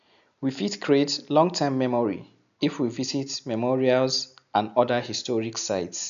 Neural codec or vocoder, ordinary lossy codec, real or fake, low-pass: none; AAC, 96 kbps; real; 7.2 kHz